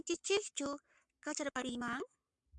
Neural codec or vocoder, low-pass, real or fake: codec, 44.1 kHz, 7.8 kbps, Pupu-Codec; 10.8 kHz; fake